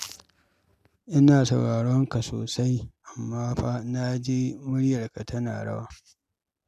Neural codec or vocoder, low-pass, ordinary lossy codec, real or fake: none; 14.4 kHz; none; real